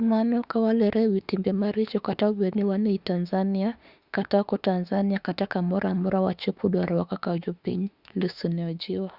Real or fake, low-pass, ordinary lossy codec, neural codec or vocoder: fake; 5.4 kHz; Opus, 64 kbps; autoencoder, 48 kHz, 32 numbers a frame, DAC-VAE, trained on Japanese speech